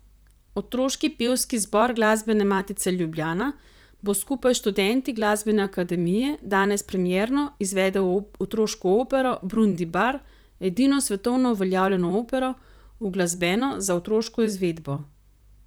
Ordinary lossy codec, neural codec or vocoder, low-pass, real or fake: none; vocoder, 44.1 kHz, 128 mel bands, Pupu-Vocoder; none; fake